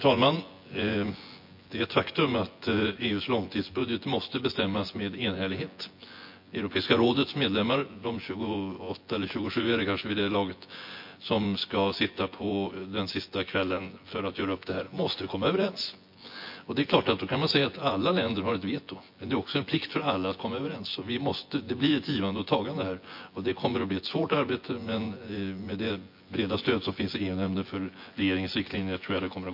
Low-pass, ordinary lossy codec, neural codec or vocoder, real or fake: 5.4 kHz; MP3, 32 kbps; vocoder, 24 kHz, 100 mel bands, Vocos; fake